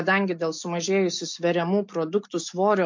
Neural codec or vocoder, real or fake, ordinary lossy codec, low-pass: none; real; MP3, 48 kbps; 7.2 kHz